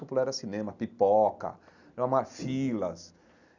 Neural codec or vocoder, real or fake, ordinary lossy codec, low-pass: none; real; none; 7.2 kHz